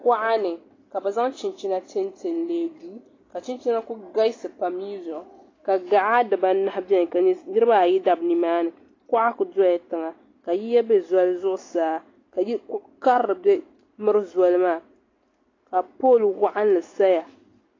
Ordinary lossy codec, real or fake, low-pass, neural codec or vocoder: AAC, 32 kbps; real; 7.2 kHz; none